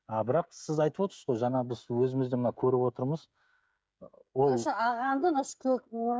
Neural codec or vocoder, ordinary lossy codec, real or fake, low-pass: codec, 16 kHz, 16 kbps, FreqCodec, smaller model; none; fake; none